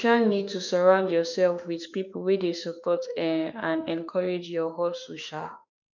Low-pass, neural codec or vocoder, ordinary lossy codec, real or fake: 7.2 kHz; autoencoder, 48 kHz, 32 numbers a frame, DAC-VAE, trained on Japanese speech; none; fake